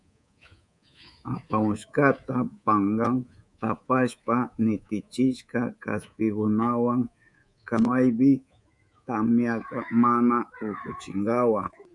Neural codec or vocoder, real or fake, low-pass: codec, 24 kHz, 3.1 kbps, DualCodec; fake; 10.8 kHz